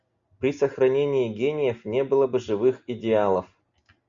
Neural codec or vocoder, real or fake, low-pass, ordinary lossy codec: none; real; 7.2 kHz; AAC, 64 kbps